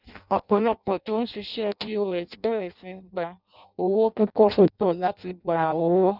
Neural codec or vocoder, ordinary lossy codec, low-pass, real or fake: codec, 16 kHz in and 24 kHz out, 0.6 kbps, FireRedTTS-2 codec; none; 5.4 kHz; fake